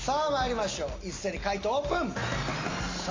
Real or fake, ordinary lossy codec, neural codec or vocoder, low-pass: real; AAC, 32 kbps; none; 7.2 kHz